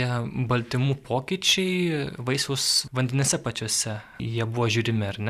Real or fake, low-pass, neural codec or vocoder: fake; 14.4 kHz; vocoder, 44.1 kHz, 128 mel bands every 512 samples, BigVGAN v2